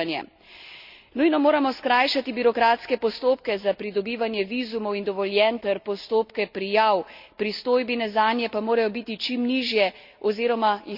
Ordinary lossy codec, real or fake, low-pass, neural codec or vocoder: Opus, 64 kbps; real; 5.4 kHz; none